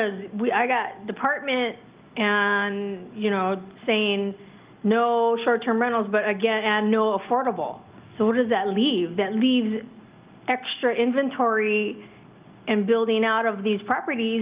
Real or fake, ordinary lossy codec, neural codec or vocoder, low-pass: real; Opus, 32 kbps; none; 3.6 kHz